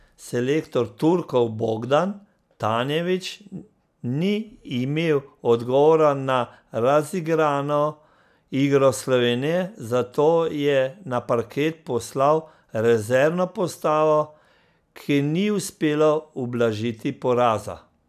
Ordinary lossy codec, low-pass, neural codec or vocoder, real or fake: none; 14.4 kHz; none; real